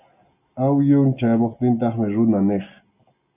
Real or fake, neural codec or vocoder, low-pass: real; none; 3.6 kHz